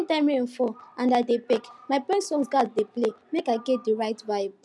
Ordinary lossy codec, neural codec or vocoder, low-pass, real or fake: none; none; none; real